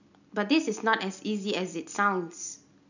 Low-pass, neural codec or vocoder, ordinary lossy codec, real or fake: 7.2 kHz; none; none; real